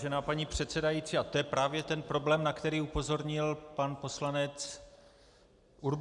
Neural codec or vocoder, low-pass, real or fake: none; 10.8 kHz; real